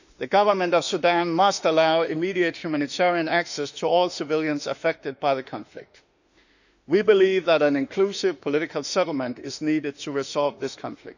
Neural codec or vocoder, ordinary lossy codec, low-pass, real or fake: autoencoder, 48 kHz, 32 numbers a frame, DAC-VAE, trained on Japanese speech; none; 7.2 kHz; fake